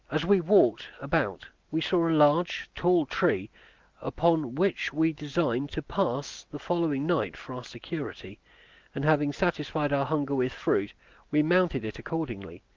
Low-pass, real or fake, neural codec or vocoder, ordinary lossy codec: 7.2 kHz; real; none; Opus, 24 kbps